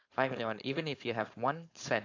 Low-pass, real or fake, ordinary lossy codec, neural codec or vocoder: 7.2 kHz; fake; AAC, 48 kbps; codec, 16 kHz, 4.8 kbps, FACodec